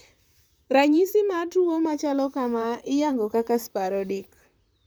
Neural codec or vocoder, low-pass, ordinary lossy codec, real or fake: vocoder, 44.1 kHz, 128 mel bands, Pupu-Vocoder; none; none; fake